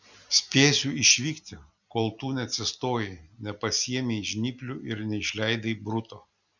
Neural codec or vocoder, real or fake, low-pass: none; real; 7.2 kHz